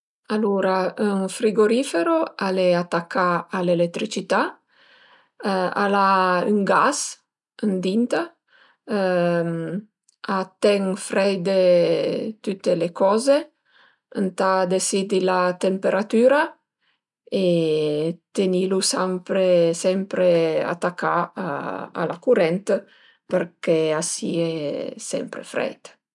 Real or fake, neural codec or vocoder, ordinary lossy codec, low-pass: real; none; none; 10.8 kHz